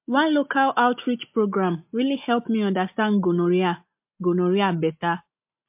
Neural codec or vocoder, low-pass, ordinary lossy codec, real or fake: none; 3.6 kHz; MP3, 32 kbps; real